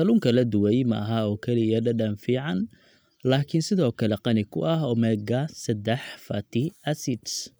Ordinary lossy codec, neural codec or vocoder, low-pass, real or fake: none; none; none; real